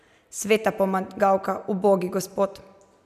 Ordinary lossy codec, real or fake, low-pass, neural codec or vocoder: none; real; 14.4 kHz; none